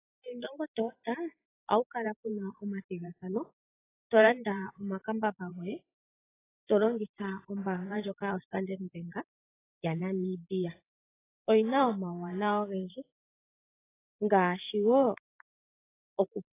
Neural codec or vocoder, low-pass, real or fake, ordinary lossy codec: vocoder, 44.1 kHz, 128 mel bands every 512 samples, BigVGAN v2; 3.6 kHz; fake; AAC, 16 kbps